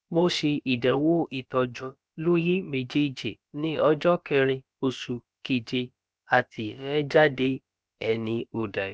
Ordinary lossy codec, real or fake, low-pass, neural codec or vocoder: none; fake; none; codec, 16 kHz, about 1 kbps, DyCAST, with the encoder's durations